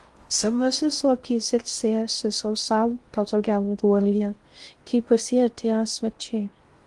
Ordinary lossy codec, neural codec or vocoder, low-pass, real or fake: Opus, 24 kbps; codec, 16 kHz in and 24 kHz out, 0.6 kbps, FocalCodec, streaming, 4096 codes; 10.8 kHz; fake